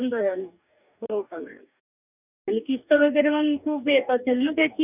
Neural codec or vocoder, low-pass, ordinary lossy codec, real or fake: codec, 44.1 kHz, 2.6 kbps, DAC; 3.6 kHz; none; fake